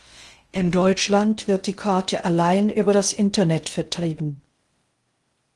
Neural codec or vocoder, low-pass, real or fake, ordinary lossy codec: codec, 16 kHz in and 24 kHz out, 0.6 kbps, FocalCodec, streaming, 2048 codes; 10.8 kHz; fake; Opus, 24 kbps